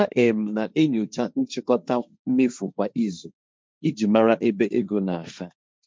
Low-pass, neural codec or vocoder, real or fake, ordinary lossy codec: none; codec, 16 kHz, 1.1 kbps, Voila-Tokenizer; fake; none